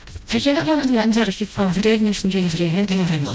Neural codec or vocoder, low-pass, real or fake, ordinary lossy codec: codec, 16 kHz, 0.5 kbps, FreqCodec, smaller model; none; fake; none